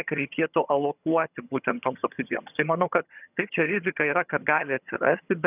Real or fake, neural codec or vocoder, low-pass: fake; vocoder, 22.05 kHz, 80 mel bands, HiFi-GAN; 3.6 kHz